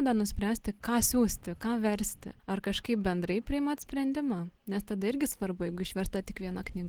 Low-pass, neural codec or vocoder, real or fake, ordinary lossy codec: 19.8 kHz; none; real; Opus, 16 kbps